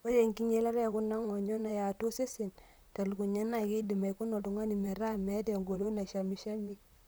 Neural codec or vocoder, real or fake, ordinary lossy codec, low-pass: vocoder, 44.1 kHz, 128 mel bands, Pupu-Vocoder; fake; none; none